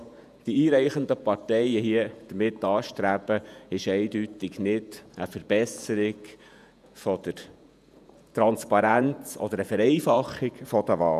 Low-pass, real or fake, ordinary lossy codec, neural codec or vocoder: 14.4 kHz; fake; none; vocoder, 48 kHz, 128 mel bands, Vocos